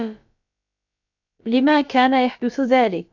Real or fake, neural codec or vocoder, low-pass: fake; codec, 16 kHz, about 1 kbps, DyCAST, with the encoder's durations; 7.2 kHz